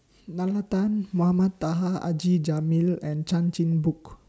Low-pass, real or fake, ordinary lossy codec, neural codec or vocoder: none; real; none; none